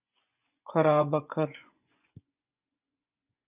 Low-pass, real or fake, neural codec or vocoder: 3.6 kHz; fake; vocoder, 24 kHz, 100 mel bands, Vocos